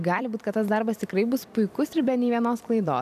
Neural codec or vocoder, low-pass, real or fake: vocoder, 44.1 kHz, 128 mel bands every 256 samples, BigVGAN v2; 14.4 kHz; fake